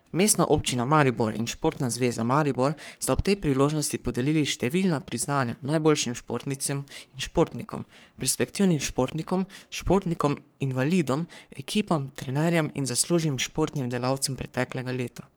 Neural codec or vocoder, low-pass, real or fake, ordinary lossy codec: codec, 44.1 kHz, 3.4 kbps, Pupu-Codec; none; fake; none